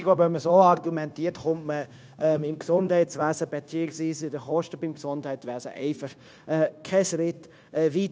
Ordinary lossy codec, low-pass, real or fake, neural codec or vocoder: none; none; fake; codec, 16 kHz, 0.9 kbps, LongCat-Audio-Codec